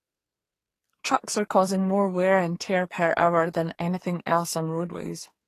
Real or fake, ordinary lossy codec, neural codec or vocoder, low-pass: fake; AAC, 48 kbps; codec, 44.1 kHz, 2.6 kbps, SNAC; 14.4 kHz